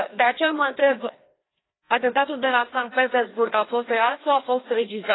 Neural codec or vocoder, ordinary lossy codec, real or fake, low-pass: codec, 16 kHz, 1 kbps, FreqCodec, larger model; AAC, 16 kbps; fake; 7.2 kHz